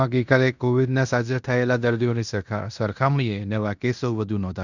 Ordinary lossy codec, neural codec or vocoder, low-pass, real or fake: none; codec, 16 kHz in and 24 kHz out, 0.9 kbps, LongCat-Audio-Codec, fine tuned four codebook decoder; 7.2 kHz; fake